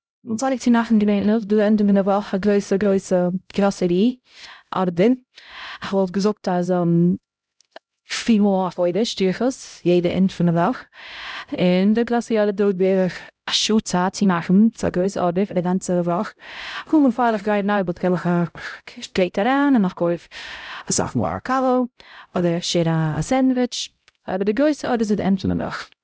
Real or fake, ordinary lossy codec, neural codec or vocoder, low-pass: fake; none; codec, 16 kHz, 0.5 kbps, X-Codec, HuBERT features, trained on LibriSpeech; none